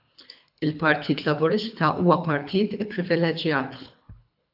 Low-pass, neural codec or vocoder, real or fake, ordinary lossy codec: 5.4 kHz; codec, 24 kHz, 3 kbps, HILCodec; fake; AAC, 48 kbps